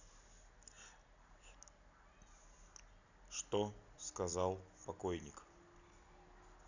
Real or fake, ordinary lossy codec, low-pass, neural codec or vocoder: real; none; 7.2 kHz; none